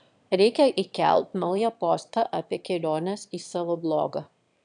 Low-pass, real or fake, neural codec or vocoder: 9.9 kHz; fake; autoencoder, 22.05 kHz, a latent of 192 numbers a frame, VITS, trained on one speaker